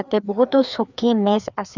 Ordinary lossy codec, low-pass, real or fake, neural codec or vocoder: none; 7.2 kHz; fake; codec, 16 kHz, 2 kbps, FreqCodec, larger model